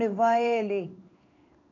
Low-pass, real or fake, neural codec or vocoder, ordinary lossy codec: 7.2 kHz; fake; codec, 16 kHz in and 24 kHz out, 1 kbps, XY-Tokenizer; none